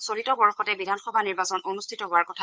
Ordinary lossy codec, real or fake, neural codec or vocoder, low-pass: none; fake; codec, 16 kHz, 8 kbps, FunCodec, trained on Chinese and English, 25 frames a second; none